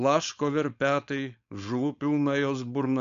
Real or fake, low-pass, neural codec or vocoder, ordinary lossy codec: fake; 7.2 kHz; codec, 16 kHz, 4.8 kbps, FACodec; AAC, 64 kbps